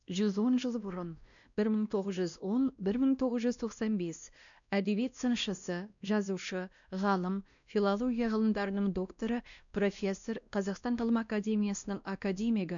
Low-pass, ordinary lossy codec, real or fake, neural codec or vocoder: 7.2 kHz; none; fake; codec, 16 kHz, 1 kbps, X-Codec, WavLM features, trained on Multilingual LibriSpeech